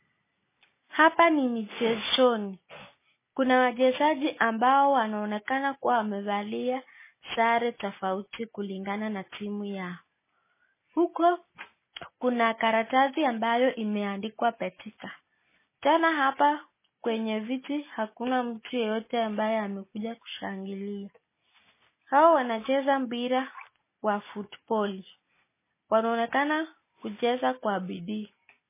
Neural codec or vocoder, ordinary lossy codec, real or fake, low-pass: none; MP3, 16 kbps; real; 3.6 kHz